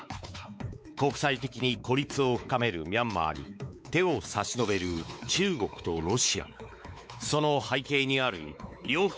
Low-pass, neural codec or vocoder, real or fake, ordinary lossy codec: none; codec, 16 kHz, 4 kbps, X-Codec, WavLM features, trained on Multilingual LibriSpeech; fake; none